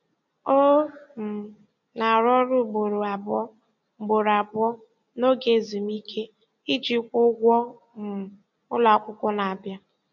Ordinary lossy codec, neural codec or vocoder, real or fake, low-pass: none; none; real; 7.2 kHz